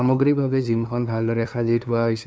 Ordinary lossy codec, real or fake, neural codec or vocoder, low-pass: none; fake; codec, 16 kHz, 2 kbps, FunCodec, trained on LibriTTS, 25 frames a second; none